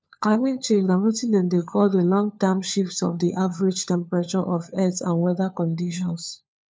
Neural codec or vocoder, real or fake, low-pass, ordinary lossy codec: codec, 16 kHz, 4 kbps, FunCodec, trained on LibriTTS, 50 frames a second; fake; none; none